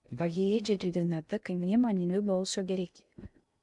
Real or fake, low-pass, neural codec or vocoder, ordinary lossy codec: fake; 10.8 kHz; codec, 16 kHz in and 24 kHz out, 0.6 kbps, FocalCodec, streaming, 2048 codes; MP3, 96 kbps